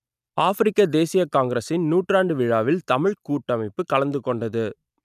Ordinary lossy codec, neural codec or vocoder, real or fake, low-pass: none; none; real; 14.4 kHz